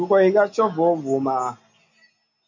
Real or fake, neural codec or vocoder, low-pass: real; none; 7.2 kHz